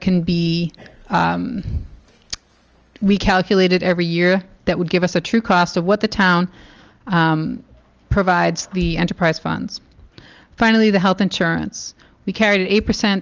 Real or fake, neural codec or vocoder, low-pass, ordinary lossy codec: real; none; 7.2 kHz; Opus, 24 kbps